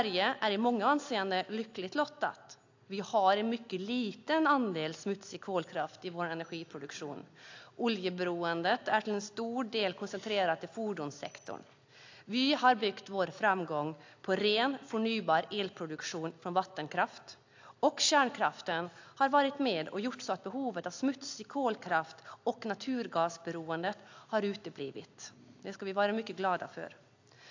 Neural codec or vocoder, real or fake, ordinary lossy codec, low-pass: none; real; AAC, 48 kbps; 7.2 kHz